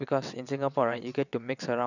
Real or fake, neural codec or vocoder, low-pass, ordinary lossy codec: fake; vocoder, 22.05 kHz, 80 mel bands, WaveNeXt; 7.2 kHz; none